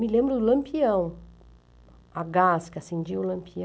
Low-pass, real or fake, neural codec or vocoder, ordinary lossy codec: none; real; none; none